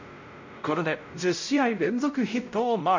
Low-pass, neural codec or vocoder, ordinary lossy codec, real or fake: 7.2 kHz; codec, 16 kHz, 0.5 kbps, X-Codec, WavLM features, trained on Multilingual LibriSpeech; MP3, 64 kbps; fake